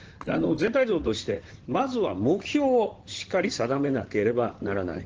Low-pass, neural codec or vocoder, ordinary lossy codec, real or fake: 7.2 kHz; codec, 16 kHz in and 24 kHz out, 2.2 kbps, FireRedTTS-2 codec; Opus, 16 kbps; fake